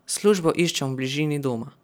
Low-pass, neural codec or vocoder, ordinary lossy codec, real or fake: none; none; none; real